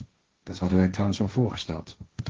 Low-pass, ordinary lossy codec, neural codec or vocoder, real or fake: 7.2 kHz; Opus, 16 kbps; codec, 16 kHz, 1.1 kbps, Voila-Tokenizer; fake